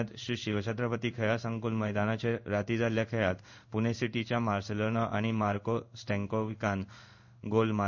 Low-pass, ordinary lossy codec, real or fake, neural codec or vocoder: 7.2 kHz; none; fake; codec, 16 kHz in and 24 kHz out, 1 kbps, XY-Tokenizer